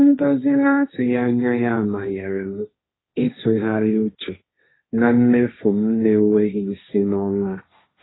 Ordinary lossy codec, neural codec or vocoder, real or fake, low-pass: AAC, 16 kbps; codec, 16 kHz, 1.1 kbps, Voila-Tokenizer; fake; 7.2 kHz